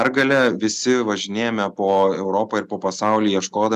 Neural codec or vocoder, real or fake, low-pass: none; real; 14.4 kHz